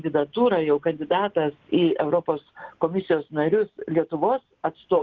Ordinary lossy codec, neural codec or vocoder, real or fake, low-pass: Opus, 16 kbps; none; real; 7.2 kHz